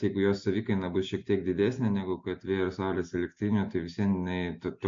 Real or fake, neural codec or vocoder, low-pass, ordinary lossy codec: real; none; 7.2 kHz; MP3, 48 kbps